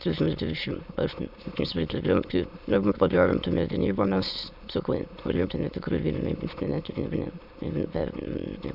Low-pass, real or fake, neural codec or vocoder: 5.4 kHz; fake; autoencoder, 22.05 kHz, a latent of 192 numbers a frame, VITS, trained on many speakers